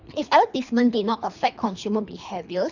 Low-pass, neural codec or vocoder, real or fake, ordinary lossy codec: 7.2 kHz; codec, 24 kHz, 3 kbps, HILCodec; fake; none